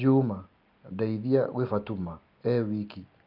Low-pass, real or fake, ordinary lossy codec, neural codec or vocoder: 5.4 kHz; real; Opus, 24 kbps; none